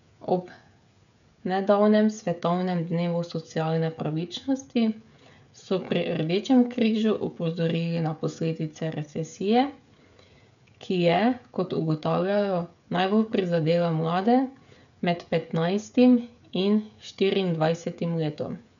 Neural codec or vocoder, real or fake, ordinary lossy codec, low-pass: codec, 16 kHz, 16 kbps, FreqCodec, smaller model; fake; none; 7.2 kHz